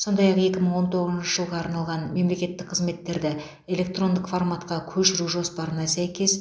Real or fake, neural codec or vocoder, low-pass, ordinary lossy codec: real; none; none; none